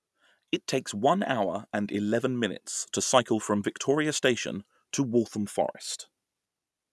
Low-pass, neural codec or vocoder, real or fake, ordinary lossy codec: none; none; real; none